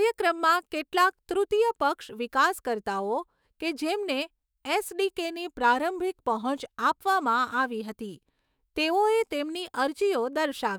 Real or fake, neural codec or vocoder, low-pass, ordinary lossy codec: fake; autoencoder, 48 kHz, 128 numbers a frame, DAC-VAE, trained on Japanese speech; none; none